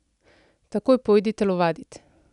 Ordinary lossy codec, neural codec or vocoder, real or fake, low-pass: none; none; real; 10.8 kHz